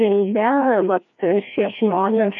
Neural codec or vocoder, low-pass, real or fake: codec, 16 kHz, 1 kbps, FreqCodec, larger model; 7.2 kHz; fake